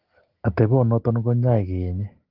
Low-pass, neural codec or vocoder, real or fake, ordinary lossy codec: 5.4 kHz; none; real; Opus, 16 kbps